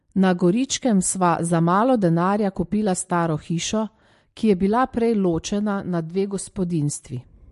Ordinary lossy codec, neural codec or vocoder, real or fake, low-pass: MP3, 48 kbps; none; real; 14.4 kHz